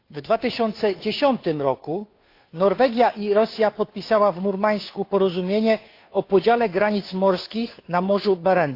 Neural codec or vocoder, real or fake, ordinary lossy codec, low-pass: codec, 16 kHz, 2 kbps, FunCodec, trained on Chinese and English, 25 frames a second; fake; AAC, 32 kbps; 5.4 kHz